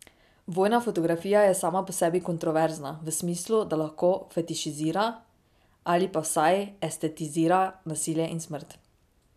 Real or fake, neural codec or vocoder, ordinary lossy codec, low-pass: real; none; none; 14.4 kHz